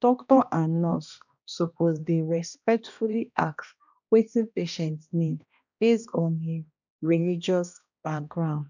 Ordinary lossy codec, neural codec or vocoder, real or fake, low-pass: none; codec, 16 kHz, 1 kbps, X-Codec, HuBERT features, trained on balanced general audio; fake; 7.2 kHz